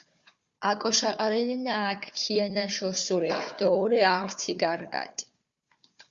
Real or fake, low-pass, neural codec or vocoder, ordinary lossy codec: fake; 7.2 kHz; codec, 16 kHz, 4 kbps, FunCodec, trained on Chinese and English, 50 frames a second; Opus, 64 kbps